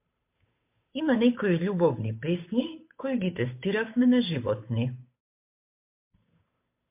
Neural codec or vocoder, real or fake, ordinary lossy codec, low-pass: codec, 16 kHz, 8 kbps, FunCodec, trained on Chinese and English, 25 frames a second; fake; MP3, 24 kbps; 3.6 kHz